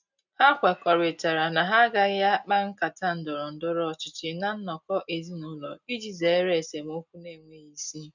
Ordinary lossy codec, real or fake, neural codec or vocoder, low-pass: none; real; none; 7.2 kHz